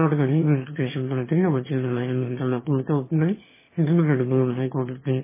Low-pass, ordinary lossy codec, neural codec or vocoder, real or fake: 3.6 kHz; MP3, 16 kbps; autoencoder, 22.05 kHz, a latent of 192 numbers a frame, VITS, trained on one speaker; fake